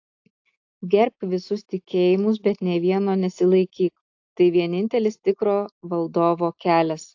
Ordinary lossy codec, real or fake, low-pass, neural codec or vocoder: AAC, 48 kbps; real; 7.2 kHz; none